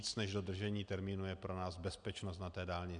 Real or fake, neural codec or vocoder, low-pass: real; none; 9.9 kHz